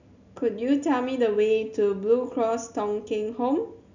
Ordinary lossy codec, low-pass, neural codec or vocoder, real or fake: none; 7.2 kHz; none; real